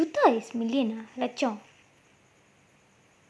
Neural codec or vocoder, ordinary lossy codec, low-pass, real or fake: none; none; none; real